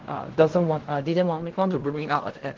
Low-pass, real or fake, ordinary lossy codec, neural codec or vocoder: 7.2 kHz; fake; Opus, 16 kbps; codec, 16 kHz in and 24 kHz out, 0.4 kbps, LongCat-Audio-Codec, fine tuned four codebook decoder